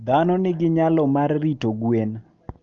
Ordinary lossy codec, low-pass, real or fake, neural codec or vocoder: Opus, 32 kbps; 7.2 kHz; real; none